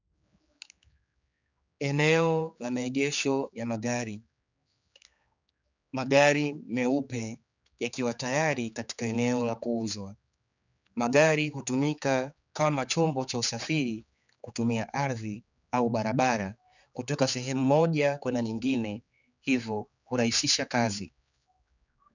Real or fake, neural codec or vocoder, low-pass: fake; codec, 16 kHz, 4 kbps, X-Codec, HuBERT features, trained on general audio; 7.2 kHz